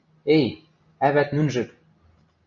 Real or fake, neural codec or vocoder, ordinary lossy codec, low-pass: real; none; AAC, 64 kbps; 7.2 kHz